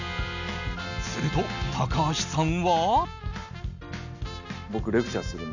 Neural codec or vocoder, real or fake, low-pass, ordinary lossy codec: none; real; 7.2 kHz; none